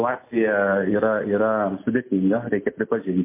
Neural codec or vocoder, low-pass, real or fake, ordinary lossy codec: none; 3.6 kHz; real; MP3, 24 kbps